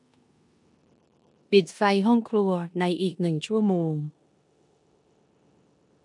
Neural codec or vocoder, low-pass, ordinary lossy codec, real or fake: codec, 16 kHz in and 24 kHz out, 0.9 kbps, LongCat-Audio-Codec, four codebook decoder; 10.8 kHz; none; fake